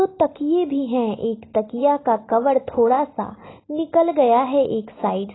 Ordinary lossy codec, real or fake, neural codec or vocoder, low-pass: AAC, 16 kbps; real; none; 7.2 kHz